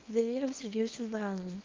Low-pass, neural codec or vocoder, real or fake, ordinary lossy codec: 7.2 kHz; codec, 24 kHz, 0.9 kbps, WavTokenizer, small release; fake; Opus, 24 kbps